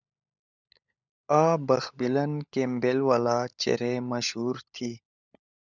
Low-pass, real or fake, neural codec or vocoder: 7.2 kHz; fake; codec, 16 kHz, 16 kbps, FunCodec, trained on LibriTTS, 50 frames a second